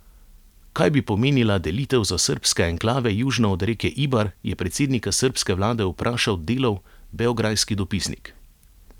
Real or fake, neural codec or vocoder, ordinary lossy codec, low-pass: real; none; none; 19.8 kHz